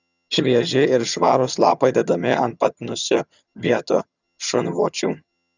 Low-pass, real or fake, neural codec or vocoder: 7.2 kHz; fake; vocoder, 22.05 kHz, 80 mel bands, HiFi-GAN